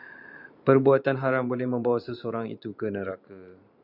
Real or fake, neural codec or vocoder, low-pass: fake; vocoder, 44.1 kHz, 128 mel bands every 512 samples, BigVGAN v2; 5.4 kHz